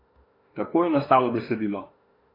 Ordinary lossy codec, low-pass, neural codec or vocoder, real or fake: AAC, 24 kbps; 5.4 kHz; autoencoder, 48 kHz, 32 numbers a frame, DAC-VAE, trained on Japanese speech; fake